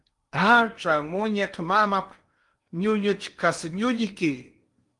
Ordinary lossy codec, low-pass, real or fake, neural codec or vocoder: Opus, 32 kbps; 10.8 kHz; fake; codec, 16 kHz in and 24 kHz out, 0.8 kbps, FocalCodec, streaming, 65536 codes